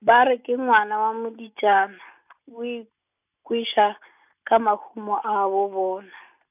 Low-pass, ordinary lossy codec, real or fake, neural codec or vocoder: 3.6 kHz; none; real; none